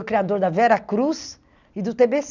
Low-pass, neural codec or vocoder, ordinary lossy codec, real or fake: 7.2 kHz; none; none; real